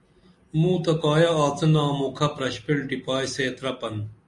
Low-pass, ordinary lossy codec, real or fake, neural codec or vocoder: 10.8 kHz; MP3, 48 kbps; real; none